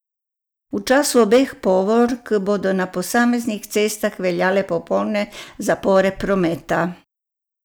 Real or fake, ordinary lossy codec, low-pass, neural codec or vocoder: real; none; none; none